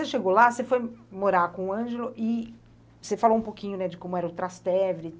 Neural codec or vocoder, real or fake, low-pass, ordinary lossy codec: none; real; none; none